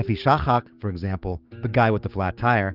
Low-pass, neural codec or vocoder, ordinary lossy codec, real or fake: 5.4 kHz; codec, 16 kHz, 6 kbps, DAC; Opus, 32 kbps; fake